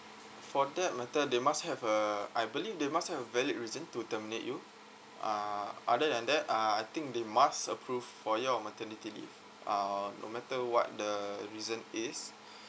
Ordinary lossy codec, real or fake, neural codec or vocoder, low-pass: none; real; none; none